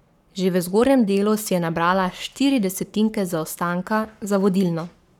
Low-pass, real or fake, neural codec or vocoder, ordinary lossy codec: 19.8 kHz; fake; codec, 44.1 kHz, 7.8 kbps, Pupu-Codec; none